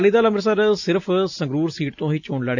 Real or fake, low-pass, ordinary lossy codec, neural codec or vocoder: real; 7.2 kHz; none; none